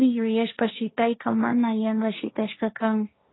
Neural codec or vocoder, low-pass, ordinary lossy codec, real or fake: codec, 16 kHz, 1.1 kbps, Voila-Tokenizer; 7.2 kHz; AAC, 16 kbps; fake